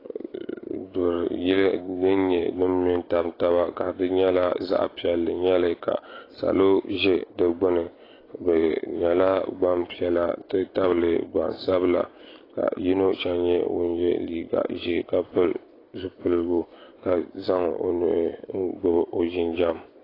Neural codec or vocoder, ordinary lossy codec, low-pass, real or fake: none; AAC, 24 kbps; 5.4 kHz; real